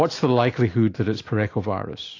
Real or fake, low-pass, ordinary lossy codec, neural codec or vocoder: real; 7.2 kHz; AAC, 32 kbps; none